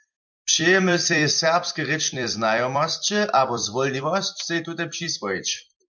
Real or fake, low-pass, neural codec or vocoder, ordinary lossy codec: fake; 7.2 kHz; vocoder, 44.1 kHz, 128 mel bands every 256 samples, BigVGAN v2; MP3, 64 kbps